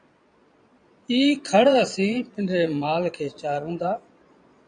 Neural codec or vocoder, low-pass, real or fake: vocoder, 22.05 kHz, 80 mel bands, Vocos; 9.9 kHz; fake